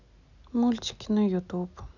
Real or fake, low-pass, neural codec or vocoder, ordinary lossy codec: real; 7.2 kHz; none; none